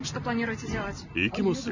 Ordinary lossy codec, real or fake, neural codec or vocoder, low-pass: none; real; none; 7.2 kHz